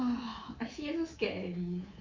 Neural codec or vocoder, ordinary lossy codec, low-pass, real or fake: codec, 24 kHz, 3.1 kbps, DualCodec; MP3, 64 kbps; 7.2 kHz; fake